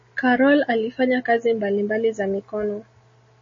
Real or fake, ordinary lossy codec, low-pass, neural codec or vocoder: real; MP3, 32 kbps; 7.2 kHz; none